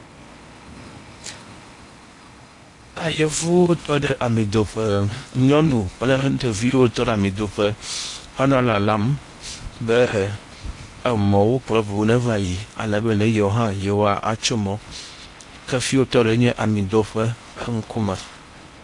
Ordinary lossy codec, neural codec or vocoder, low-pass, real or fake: AAC, 48 kbps; codec, 16 kHz in and 24 kHz out, 0.8 kbps, FocalCodec, streaming, 65536 codes; 10.8 kHz; fake